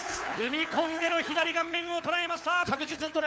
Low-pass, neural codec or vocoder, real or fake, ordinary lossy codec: none; codec, 16 kHz, 4 kbps, FunCodec, trained on LibriTTS, 50 frames a second; fake; none